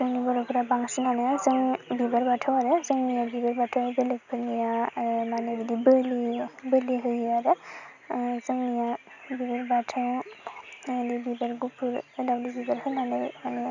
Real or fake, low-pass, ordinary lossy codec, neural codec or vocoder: real; 7.2 kHz; none; none